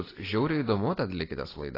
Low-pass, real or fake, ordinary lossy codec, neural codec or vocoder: 5.4 kHz; real; AAC, 24 kbps; none